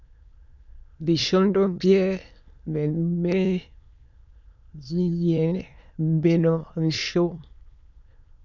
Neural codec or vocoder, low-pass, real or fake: autoencoder, 22.05 kHz, a latent of 192 numbers a frame, VITS, trained on many speakers; 7.2 kHz; fake